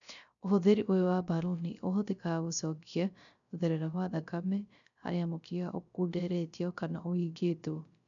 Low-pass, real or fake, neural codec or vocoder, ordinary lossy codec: 7.2 kHz; fake; codec, 16 kHz, 0.3 kbps, FocalCodec; none